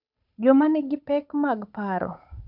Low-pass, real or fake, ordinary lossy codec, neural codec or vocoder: 5.4 kHz; fake; none; codec, 16 kHz, 8 kbps, FunCodec, trained on Chinese and English, 25 frames a second